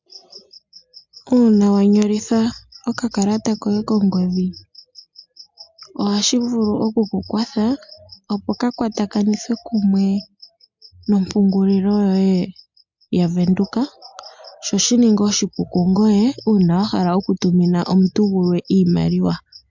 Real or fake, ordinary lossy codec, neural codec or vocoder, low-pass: real; MP3, 64 kbps; none; 7.2 kHz